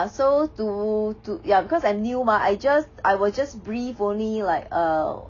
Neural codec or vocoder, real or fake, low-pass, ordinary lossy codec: none; real; 7.2 kHz; AAC, 32 kbps